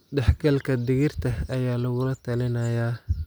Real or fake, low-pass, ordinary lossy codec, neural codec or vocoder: real; none; none; none